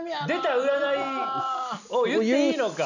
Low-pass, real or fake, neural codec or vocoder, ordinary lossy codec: 7.2 kHz; real; none; none